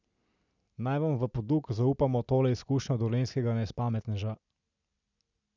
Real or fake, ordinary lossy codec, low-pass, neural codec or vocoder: real; none; 7.2 kHz; none